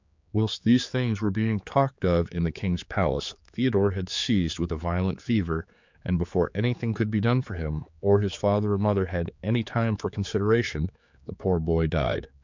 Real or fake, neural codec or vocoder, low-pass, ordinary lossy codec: fake; codec, 16 kHz, 4 kbps, X-Codec, HuBERT features, trained on general audio; 7.2 kHz; MP3, 64 kbps